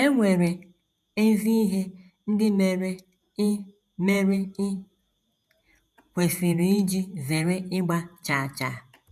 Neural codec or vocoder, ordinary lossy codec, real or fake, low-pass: vocoder, 48 kHz, 128 mel bands, Vocos; none; fake; 14.4 kHz